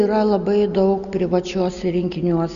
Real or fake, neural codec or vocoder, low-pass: real; none; 7.2 kHz